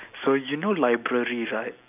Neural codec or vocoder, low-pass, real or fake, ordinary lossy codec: none; 3.6 kHz; real; none